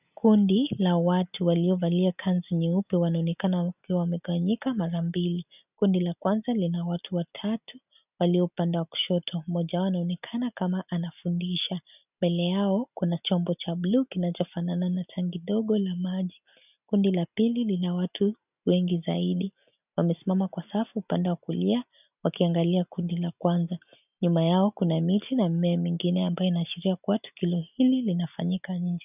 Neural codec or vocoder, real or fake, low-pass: none; real; 3.6 kHz